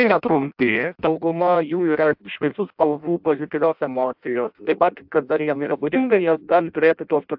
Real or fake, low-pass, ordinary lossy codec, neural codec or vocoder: fake; 5.4 kHz; AAC, 48 kbps; codec, 16 kHz in and 24 kHz out, 0.6 kbps, FireRedTTS-2 codec